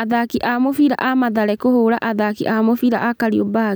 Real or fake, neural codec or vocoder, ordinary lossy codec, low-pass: real; none; none; none